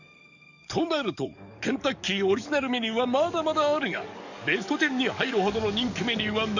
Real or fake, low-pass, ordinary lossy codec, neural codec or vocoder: fake; 7.2 kHz; none; codec, 44.1 kHz, 7.8 kbps, DAC